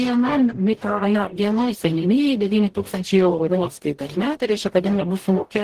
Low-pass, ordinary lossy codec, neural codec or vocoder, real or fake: 14.4 kHz; Opus, 16 kbps; codec, 44.1 kHz, 0.9 kbps, DAC; fake